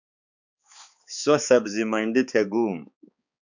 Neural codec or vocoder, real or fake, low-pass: codec, 16 kHz, 4 kbps, X-Codec, HuBERT features, trained on balanced general audio; fake; 7.2 kHz